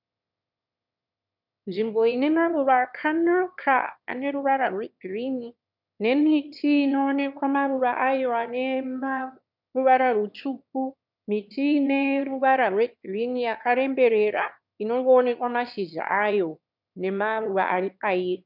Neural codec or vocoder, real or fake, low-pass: autoencoder, 22.05 kHz, a latent of 192 numbers a frame, VITS, trained on one speaker; fake; 5.4 kHz